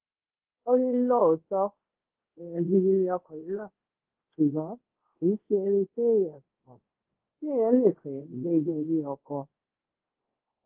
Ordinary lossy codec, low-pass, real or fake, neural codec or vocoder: Opus, 16 kbps; 3.6 kHz; fake; codec, 24 kHz, 0.5 kbps, DualCodec